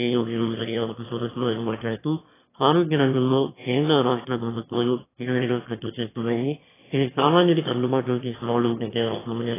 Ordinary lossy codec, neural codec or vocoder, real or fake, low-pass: AAC, 16 kbps; autoencoder, 22.05 kHz, a latent of 192 numbers a frame, VITS, trained on one speaker; fake; 3.6 kHz